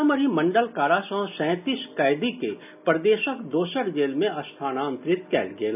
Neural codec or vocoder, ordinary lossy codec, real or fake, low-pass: none; none; real; 3.6 kHz